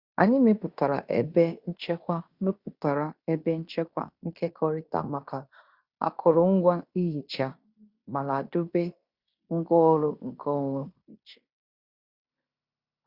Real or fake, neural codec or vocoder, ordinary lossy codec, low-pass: fake; codec, 16 kHz in and 24 kHz out, 0.9 kbps, LongCat-Audio-Codec, fine tuned four codebook decoder; Opus, 64 kbps; 5.4 kHz